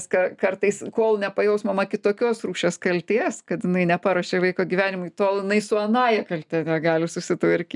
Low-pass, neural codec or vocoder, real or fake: 10.8 kHz; none; real